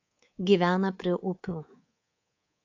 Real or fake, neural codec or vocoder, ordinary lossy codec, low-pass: fake; codec, 24 kHz, 3.1 kbps, DualCodec; AAC, 48 kbps; 7.2 kHz